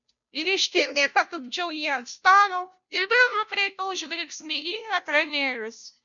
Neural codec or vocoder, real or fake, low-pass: codec, 16 kHz, 0.5 kbps, FunCodec, trained on Chinese and English, 25 frames a second; fake; 7.2 kHz